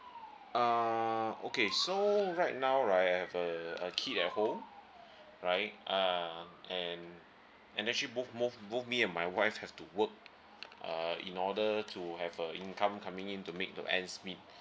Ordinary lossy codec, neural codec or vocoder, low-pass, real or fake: none; none; none; real